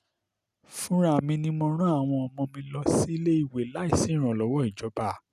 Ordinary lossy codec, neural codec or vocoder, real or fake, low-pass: none; none; real; 14.4 kHz